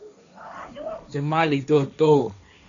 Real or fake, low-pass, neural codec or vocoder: fake; 7.2 kHz; codec, 16 kHz, 1.1 kbps, Voila-Tokenizer